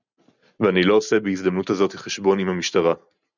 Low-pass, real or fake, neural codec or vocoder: 7.2 kHz; real; none